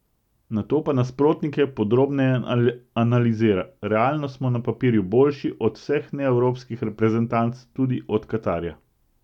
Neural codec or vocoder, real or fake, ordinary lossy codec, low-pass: none; real; none; 19.8 kHz